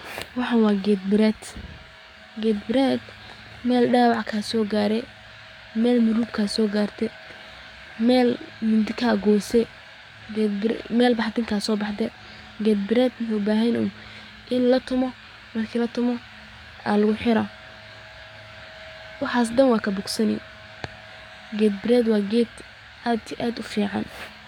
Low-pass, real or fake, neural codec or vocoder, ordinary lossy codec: 19.8 kHz; fake; codec, 44.1 kHz, 7.8 kbps, DAC; none